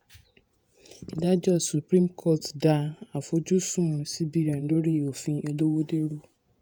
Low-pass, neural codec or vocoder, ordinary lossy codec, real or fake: none; vocoder, 48 kHz, 128 mel bands, Vocos; none; fake